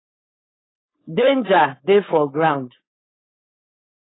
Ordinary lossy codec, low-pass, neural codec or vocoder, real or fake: AAC, 16 kbps; 7.2 kHz; codec, 16 kHz, 4.8 kbps, FACodec; fake